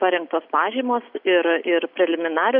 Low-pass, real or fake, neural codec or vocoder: 5.4 kHz; real; none